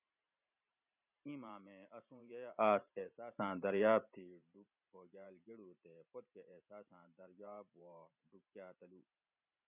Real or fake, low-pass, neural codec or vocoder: fake; 3.6 kHz; vocoder, 44.1 kHz, 128 mel bands every 512 samples, BigVGAN v2